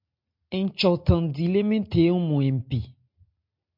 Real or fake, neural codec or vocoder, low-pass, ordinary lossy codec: real; none; 5.4 kHz; MP3, 48 kbps